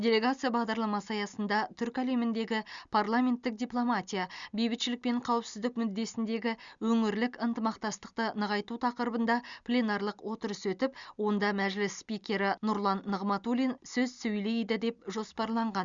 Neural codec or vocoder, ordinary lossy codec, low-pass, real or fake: none; Opus, 64 kbps; 7.2 kHz; real